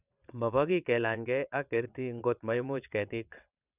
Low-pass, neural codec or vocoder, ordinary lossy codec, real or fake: 3.6 kHz; vocoder, 22.05 kHz, 80 mel bands, WaveNeXt; none; fake